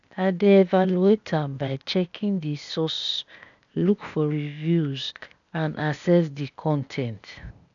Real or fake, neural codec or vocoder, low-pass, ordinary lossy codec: fake; codec, 16 kHz, 0.8 kbps, ZipCodec; 7.2 kHz; none